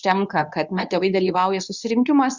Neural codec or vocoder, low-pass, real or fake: codec, 24 kHz, 0.9 kbps, WavTokenizer, medium speech release version 2; 7.2 kHz; fake